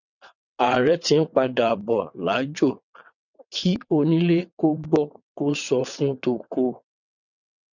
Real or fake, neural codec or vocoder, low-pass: fake; vocoder, 22.05 kHz, 80 mel bands, WaveNeXt; 7.2 kHz